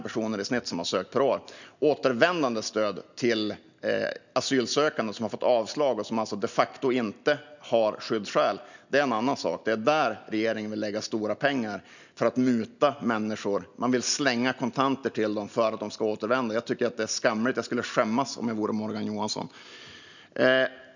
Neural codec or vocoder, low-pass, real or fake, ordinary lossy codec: none; 7.2 kHz; real; none